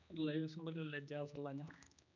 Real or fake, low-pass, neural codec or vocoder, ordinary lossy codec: fake; 7.2 kHz; codec, 16 kHz, 2 kbps, X-Codec, HuBERT features, trained on general audio; none